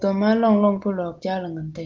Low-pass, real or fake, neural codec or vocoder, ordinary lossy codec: 7.2 kHz; real; none; Opus, 16 kbps